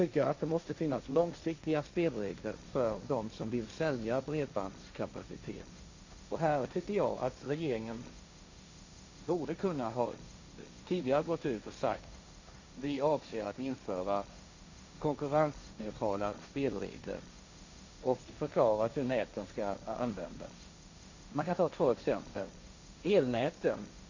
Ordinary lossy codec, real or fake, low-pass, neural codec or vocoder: Opus, 64 kbps; fake; 7.2 kHz; codec, 16 kHz, 1.1 kbps, Voila-Tokenizer